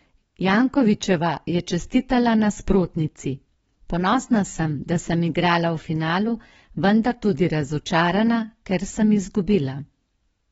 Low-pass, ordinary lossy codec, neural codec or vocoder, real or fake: 19.8 kHz; AAC, 24 kbps; codec, 44.1 kHz, 7.8 kbps, DAC; fake